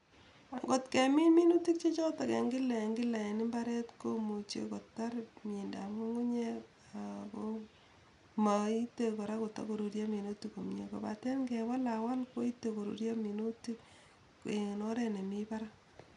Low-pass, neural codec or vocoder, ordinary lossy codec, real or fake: 10.8 kHz; none; none; real